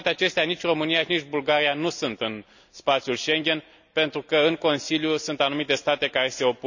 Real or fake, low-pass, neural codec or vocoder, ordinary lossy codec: real; 7.2 kHz; none; none